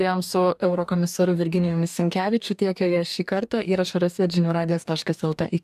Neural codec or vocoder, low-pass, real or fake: codec, 44.1 kHz, 2.6 kbps, DAC; 14.4 kHz; fake